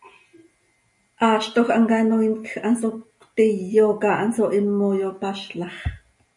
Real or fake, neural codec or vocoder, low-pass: real; none; 10.8 kHz